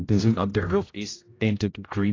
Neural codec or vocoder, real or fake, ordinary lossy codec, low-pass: codec, 16 kHz, 0.5 kbps, X-Codec, HuBERT features, trained on general audio; fake; AAC, 32 kbps; 7.2 kHz